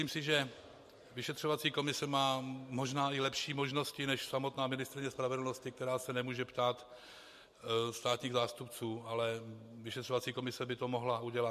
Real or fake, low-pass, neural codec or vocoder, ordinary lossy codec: real; 14.4 kHz; none; MP3, 64 kbps